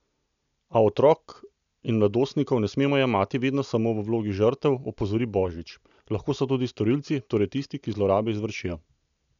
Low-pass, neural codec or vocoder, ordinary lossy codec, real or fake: 7.2 kHz; none; none; real